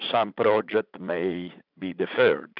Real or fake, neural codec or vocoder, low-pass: fake; vocoder, 44.1 kHz, 80 mel bands, Vocos; 5.4 kHz